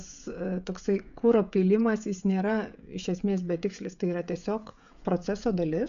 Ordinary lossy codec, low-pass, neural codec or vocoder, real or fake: AAC, 96 kbps; 7.2 kHz; codec, 16 kHz, 16 kbps, FreqCodec, smaller model; fake